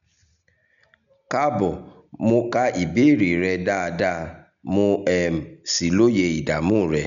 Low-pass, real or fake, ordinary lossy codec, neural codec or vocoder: 7.2 kHz; real; none; none